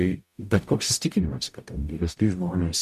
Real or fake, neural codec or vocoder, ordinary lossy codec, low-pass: fake; codec, 44.1 kHz, 0.9 kbps, DAC; MP3, 96 kbps; 14.4 kHz